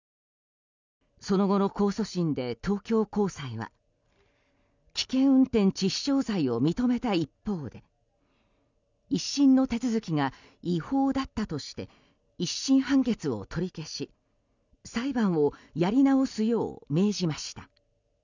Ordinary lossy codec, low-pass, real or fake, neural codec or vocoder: none; 7.2 kHz; real; none